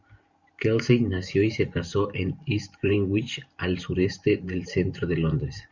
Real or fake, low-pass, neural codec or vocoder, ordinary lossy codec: fake; 7.2 kHz; vocoder, 44.1 kHz, 128 mel bands every 512 samples, BigVGAN v2; Opus, 64 kbps